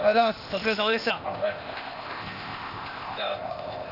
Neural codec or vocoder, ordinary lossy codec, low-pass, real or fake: codec, 16 kHz, 0.8 kbps, ZipCodec; none; 5.4 kHz; fake